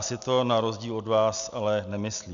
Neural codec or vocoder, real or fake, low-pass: none; real; 7.2 kHz